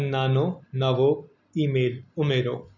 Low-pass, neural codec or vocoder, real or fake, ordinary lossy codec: 7.2 kHz; none; real; none